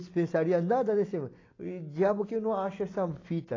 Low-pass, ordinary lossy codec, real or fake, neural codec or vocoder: 7.2 kHz; AAC, 32 kbps; real; none